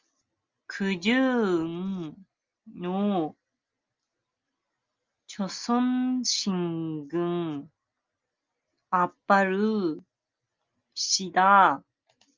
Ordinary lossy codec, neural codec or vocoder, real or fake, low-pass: Opus, 32 kbps; none; real; 7.2 kHz